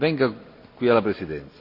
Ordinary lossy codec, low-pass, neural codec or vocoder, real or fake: none; 5.4 kHz; none; real